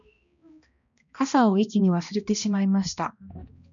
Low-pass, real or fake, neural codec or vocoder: 7.2 kHz; fake; codec, 16 kHz, 1 kbps, X-Codec, HuBERT features, trained on balanced general audio